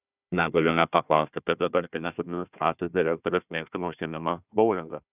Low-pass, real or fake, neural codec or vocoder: 3.6 kHz; fake; codec, 16 kHz, 1 kbps, FunCodec, trained on Chinese and English, 50 frames a second